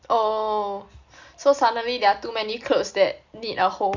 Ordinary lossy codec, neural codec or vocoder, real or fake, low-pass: Opus, 64 kbps; none; real; 7.2 kHz